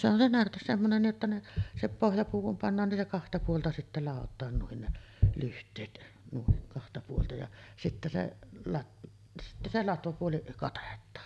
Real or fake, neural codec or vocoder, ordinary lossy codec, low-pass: real; none; none; none